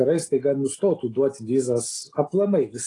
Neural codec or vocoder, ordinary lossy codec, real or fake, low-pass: none; AAC, 32 kbps; real; 10.8 kHz